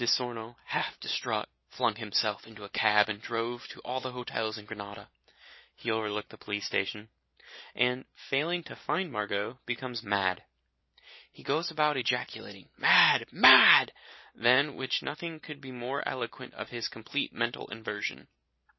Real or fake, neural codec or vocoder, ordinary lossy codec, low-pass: real; none; MP3, 24 kbps; 7.2 kHz